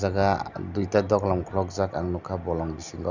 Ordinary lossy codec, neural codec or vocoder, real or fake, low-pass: Opus, 64 kbps; none; real; 7.2 kHz